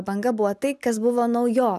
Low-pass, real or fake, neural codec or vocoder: 14.4 kHz; real; none